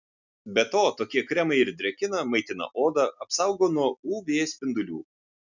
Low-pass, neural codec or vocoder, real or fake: 7.2 kHz; none; real